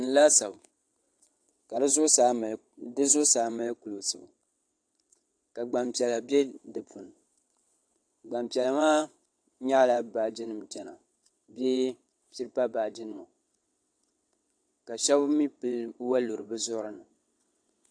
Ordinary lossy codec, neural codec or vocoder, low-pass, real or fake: AAC, 64 kbps; vocoder, 22.05 kHz, 80 mel bands, WaveNeXt; 9.9 kHz; fake